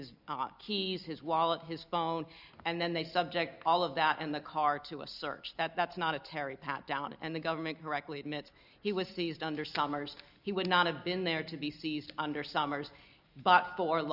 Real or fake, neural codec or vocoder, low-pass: real; none; 5.4 kHz